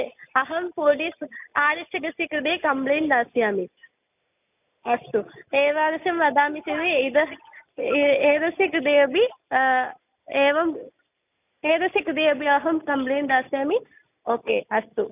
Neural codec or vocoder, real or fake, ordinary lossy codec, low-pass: none; real; none; 3.6 kHz